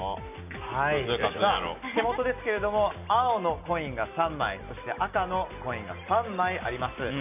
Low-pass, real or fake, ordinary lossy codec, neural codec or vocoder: 3.6 kHz; real; none; none